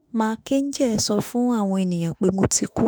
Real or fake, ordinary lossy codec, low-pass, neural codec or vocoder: fake; none; none; autoencoder, 48 kHz, 32 numbers a frame, DAC-VAE, trained on Japanese speech